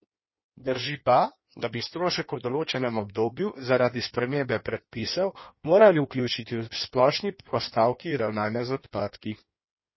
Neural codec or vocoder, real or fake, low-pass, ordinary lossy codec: codec, 16 kHz in and 24 kHz out, 1.1 kbps, FireRedTTS-2 codec; fake; 7.2 kHz; MP3, 24 kbps